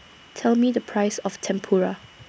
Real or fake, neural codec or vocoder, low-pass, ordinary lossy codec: real; none; none; none